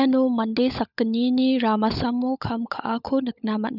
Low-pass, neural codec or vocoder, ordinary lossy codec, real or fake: 5.4 kHz; codec, 16 kHz, 16 kbps, FunCodec, trained on LibriTTS, 50 frames a second; none; fake